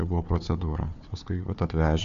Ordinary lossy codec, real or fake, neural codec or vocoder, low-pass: MP3, 48 kbps; fake; codec, 16 kHz, 4 kbps, FunCodec, trained on Chinese and English, 50 frames a second; 7.2 kHz